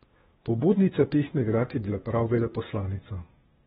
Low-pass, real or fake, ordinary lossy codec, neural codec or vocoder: 7.2 kHz; fake; AAC, 16 kbps; codec, 16 kHz, 0.8 kbps, ZipCodec